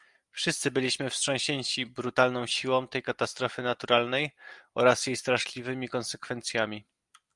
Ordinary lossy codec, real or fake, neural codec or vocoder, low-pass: Opus, 32 kbps; real; none; 10.8 kHz